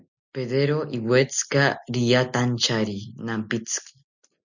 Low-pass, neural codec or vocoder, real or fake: 7.2 kHz; none; real